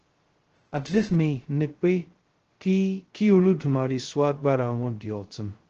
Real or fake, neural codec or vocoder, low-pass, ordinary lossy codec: fake; codec, 16 kHz, 0.2 kbps, FocalCodec; 7.2 kHz; Opus, 16 kbps